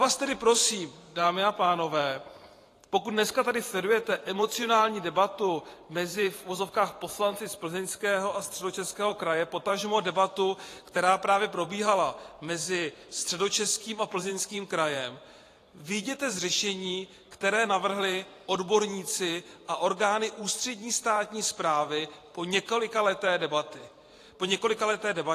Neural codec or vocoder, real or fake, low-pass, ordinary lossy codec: vocoder, 44.1 kHz, 128 mel bands every 512 samples, BigVGAN v2; fake; 14.4 kHz; AAC, 48 kbps